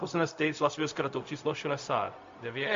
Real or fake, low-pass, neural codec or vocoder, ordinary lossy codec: fake; 7.2 kHz; codec, 16 kHz, 0.4 kbps, LongCat-Audio-Codec; MP3, 48 kbps